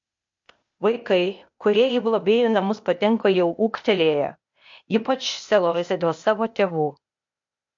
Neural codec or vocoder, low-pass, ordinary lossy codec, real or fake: codec, 16 kHz, 0.8 kbps, ZipCodec; 7.2 kHz; MP3, 48 kbps; fake